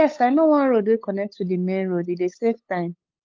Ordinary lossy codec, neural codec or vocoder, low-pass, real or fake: Opus, 24 kbps; codec, 16 kHz, 8 kbps, FunCodec, trained on LibriTTS, 25 frames a second; 7.2 kHz; fake